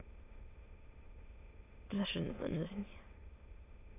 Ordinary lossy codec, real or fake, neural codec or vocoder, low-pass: none; fake; autoencoder, 22.05 kHz, a latent of 192 numbers a frame, VITS, trained on many speakers; 3.6 kHz